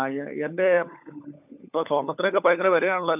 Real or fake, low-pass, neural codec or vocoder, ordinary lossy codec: fake; 3.6 kHz; codec, 16 kHz, 16 kbps, FunCodec, trained on LibriTTS, 50 frames a second; none